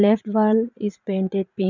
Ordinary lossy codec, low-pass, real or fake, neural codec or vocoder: none; 7.2 kHz; fake; vocoder, 44.1 kHz, 80 mel bands, Vocos